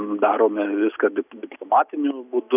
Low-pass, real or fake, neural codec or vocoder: 3.6 kHz; real; none